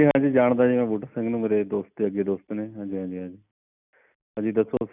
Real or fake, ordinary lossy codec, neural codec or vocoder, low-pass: real; none; none; 3.6 kHz